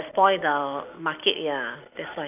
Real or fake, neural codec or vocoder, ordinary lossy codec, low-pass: real; none; none; 3.6 kHz